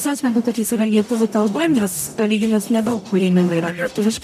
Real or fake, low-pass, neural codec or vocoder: fake; 14.4 kHz; codec, 44.1 kHz, 0.9 kbps, DAC